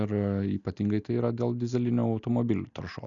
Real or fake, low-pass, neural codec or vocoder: real; 7.2 kHz; none